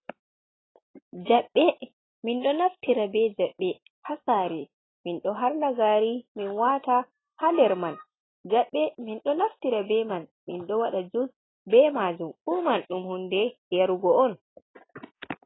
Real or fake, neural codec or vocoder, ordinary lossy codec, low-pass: real; none; AAC, 16 kbps; 7.2 kHz